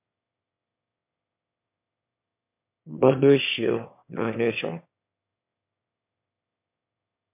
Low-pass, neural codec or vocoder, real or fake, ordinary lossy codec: 3.6 kHz; autoencoder, 22.05 kHz, a latent of 192 numbers a frame, VITS, trained on one speaker; fake; MP3, 32 kbps